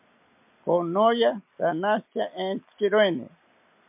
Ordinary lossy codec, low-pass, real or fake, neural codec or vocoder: MP3, 32 kbps; 3.6 kHz; real; none